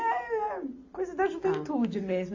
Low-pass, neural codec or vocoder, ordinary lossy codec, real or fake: 7.2 kHz; none; AAC, 48 kbps; real